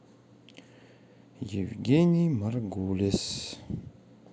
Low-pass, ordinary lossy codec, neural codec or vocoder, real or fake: none; none; none; real